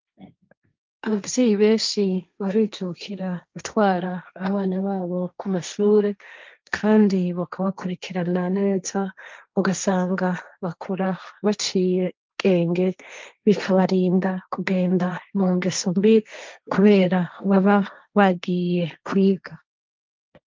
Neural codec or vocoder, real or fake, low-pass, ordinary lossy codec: codec, 16 kHz, 1.1 kbps, Voila-Tokenizer; fake; 7.2 kHz; Opus, 24 kbps